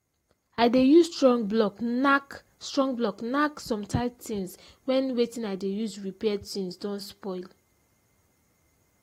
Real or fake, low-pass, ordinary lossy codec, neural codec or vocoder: real; 19.8 kHz; AAC, 48 kbps; none